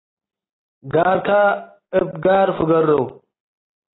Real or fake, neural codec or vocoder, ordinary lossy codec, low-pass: real; none; AAC, 16 kbps; 7.2 kHz